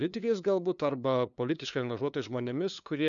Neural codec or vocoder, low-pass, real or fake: codec, 16 kHz, 2 kbps, FunCodec, trained on LibriTTS, 25 frames a second; 7.2 kHz; fake